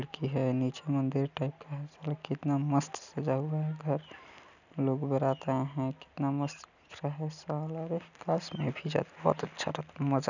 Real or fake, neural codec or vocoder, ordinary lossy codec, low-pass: real; none; none; 7.2 kHz